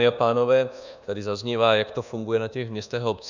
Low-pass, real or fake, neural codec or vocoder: 7.2 kHz; fake; codec, 24 kHz, 1.2 kbps, DualCodec